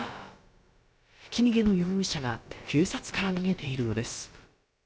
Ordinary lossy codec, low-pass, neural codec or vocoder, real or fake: none; none; codec, 16 kHz, about 1 kbps, DyCAST, with the encoder's durations; fake